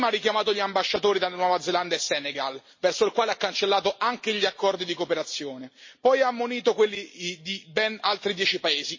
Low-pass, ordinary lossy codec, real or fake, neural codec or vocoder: 7.2 kHz; MP3, 32 kbps; real; none